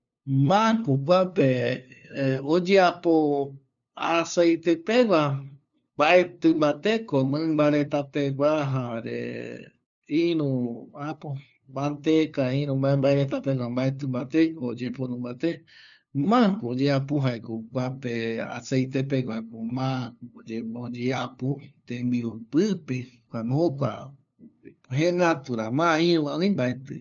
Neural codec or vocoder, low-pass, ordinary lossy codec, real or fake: codec, 16 kHz, 2 kbps, FunCodec, trained on LibriTTS, 25 frames a second; 7.2 kHz; none; fake